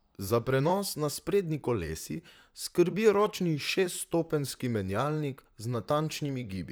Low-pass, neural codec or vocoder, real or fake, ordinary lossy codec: none; vocoder, 44.1 kHz, 128 mel bands, Pupu-Vocoder; fake; none